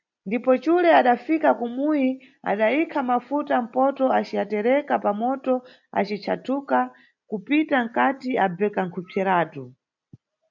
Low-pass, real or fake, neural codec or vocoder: 7.2 kHz; real; none